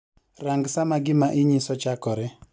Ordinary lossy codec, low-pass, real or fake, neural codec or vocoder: none; none; real; none